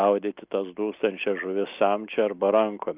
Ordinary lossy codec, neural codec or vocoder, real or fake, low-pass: Opus, 24 kbps; none; real; 3.6 kHz